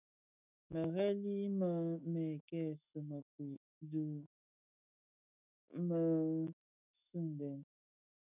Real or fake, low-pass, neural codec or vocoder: fake; 3.6 kHz; autoencoder, 48 kHz, 128 numbers a frame, DAC-VAE, trained on Japanese speech